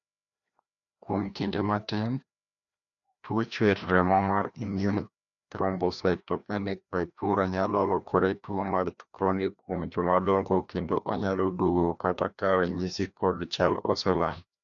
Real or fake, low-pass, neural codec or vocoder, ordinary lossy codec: fake; 7.2 kHz; codec, 16 kHz, 1 kbps, FreqCodec, larger model; none